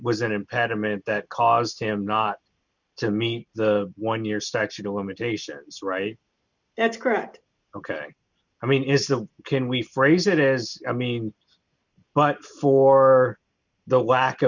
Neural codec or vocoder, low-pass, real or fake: none; 7.2 kHz; real